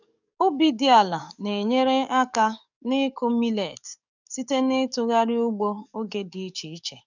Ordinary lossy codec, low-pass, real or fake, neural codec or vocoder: none; 7.2 kHz; fake; codec, 44.1 kHz, 7.8 kbps, DAC